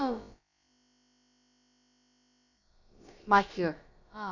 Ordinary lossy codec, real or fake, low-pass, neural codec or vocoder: none; fake; 7.2 kHz; codec, 16 kHz, about 1 kbps, DyCAST, with the encoder's durations